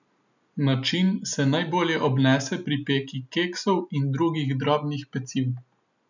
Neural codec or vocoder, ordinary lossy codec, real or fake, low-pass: none; none; real; 7.2 kHz